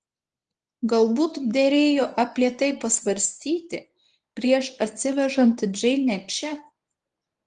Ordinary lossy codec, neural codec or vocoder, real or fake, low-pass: Opus, 24 kbps; codec, 24 kHz, 0.9 kbps, WavTokenizer, medium speech release version 1; fake; 10.8 kHz